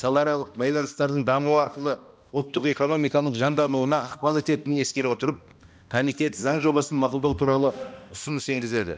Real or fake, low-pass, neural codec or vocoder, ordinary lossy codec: fake; none; codec, 16 kHz, 1 kbps, X-Codec, HuBERT features, trained on balanced general audio; none